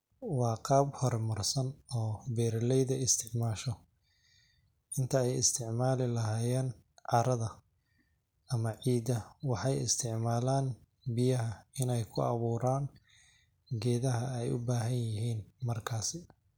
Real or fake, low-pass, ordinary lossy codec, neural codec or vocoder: real; none; none; none